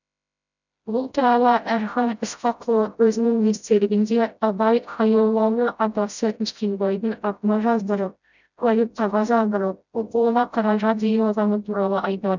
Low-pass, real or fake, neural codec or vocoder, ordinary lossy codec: 7.2 kHz; fake; codec, 16 kHz, 0.5 kbps, FreqCodec, smaller model; none